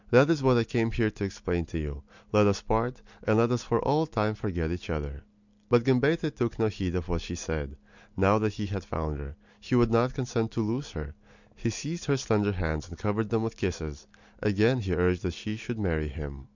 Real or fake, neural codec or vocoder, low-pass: real; none; 7.2 kHz